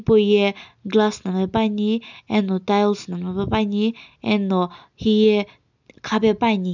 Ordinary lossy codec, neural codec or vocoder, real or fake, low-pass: none; none; real; 7.2 kHz